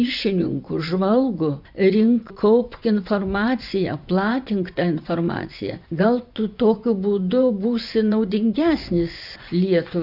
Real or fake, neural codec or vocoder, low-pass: fake; vocoder, 24 kHz, 100 mel bands, Vocos; 5.4 kHz